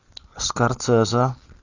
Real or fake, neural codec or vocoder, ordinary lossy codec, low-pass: real; none; Opus, 64 kbps; 7.2 kHz